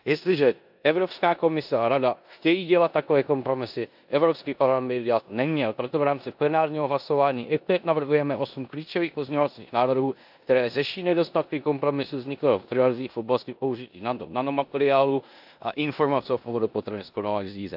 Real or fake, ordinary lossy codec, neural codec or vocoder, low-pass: fake; AAC, 48 kbps; codec, 16 kHz in and 24 kHz out, 0.9 kbps, LongCat-Audio-Codec, four codebook decoder; 5.4 kHz